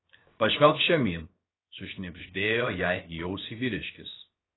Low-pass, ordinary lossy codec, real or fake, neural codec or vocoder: 7.2 kHz; AAC, 16 kbps; fake; codec, 16 kHz, 0.7 kbps, FocalCodec